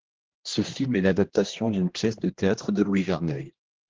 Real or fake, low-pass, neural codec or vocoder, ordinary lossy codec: fake; 7.2 kHz; codec, 16 kHz, 1 kbps, X-Codec, HuBERT features, trained on general audio; Opus, 16 kbps